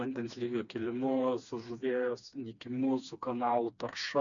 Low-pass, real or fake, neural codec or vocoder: 7.2 kHz; fake; codec, 16 kHz, 2 kbps, FreqCodec, smaller model